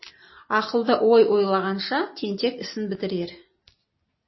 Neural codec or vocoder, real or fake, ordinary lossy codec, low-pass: none; real; MP3, 24 kbps; 7.2 kHz